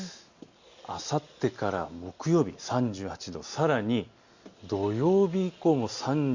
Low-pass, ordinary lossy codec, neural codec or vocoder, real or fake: 7.2 kHz; Opus, 64 kbps; none; real